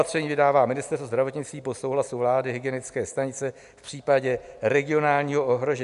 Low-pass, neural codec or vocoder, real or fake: 10.8 kHz; none; real